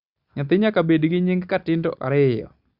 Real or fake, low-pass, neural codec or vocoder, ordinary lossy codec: real; 5.4 kHz; none; Opus, 64 kbps